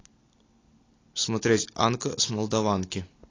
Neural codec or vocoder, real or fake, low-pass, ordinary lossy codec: none; real; 7.2 kHz; AAC, 32 kbps